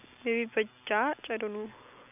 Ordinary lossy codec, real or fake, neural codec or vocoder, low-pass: none; real; none; 3.6 kHz